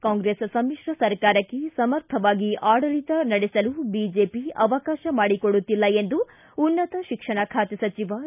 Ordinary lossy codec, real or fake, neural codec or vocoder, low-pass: none; real; none; 3.6 kHz